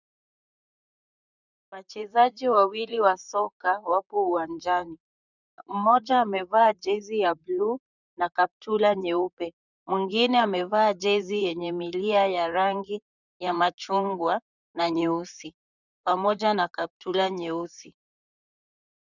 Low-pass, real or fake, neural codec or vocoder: 7.2 kHz; fake; vocoder, 44.1 kHz, 128 mel bands, Pupu-Vocoder